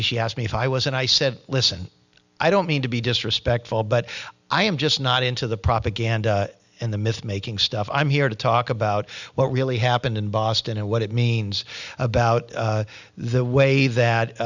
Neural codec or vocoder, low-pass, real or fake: none; 7.2 kHz; real